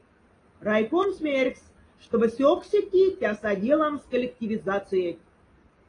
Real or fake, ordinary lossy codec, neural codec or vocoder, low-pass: real; AAC, 32 kbps; none; 9.9 kHz